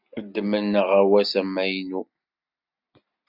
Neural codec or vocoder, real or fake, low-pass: none; real; 5.4 kHz